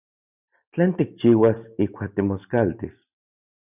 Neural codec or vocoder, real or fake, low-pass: none; real; 3.6 kHz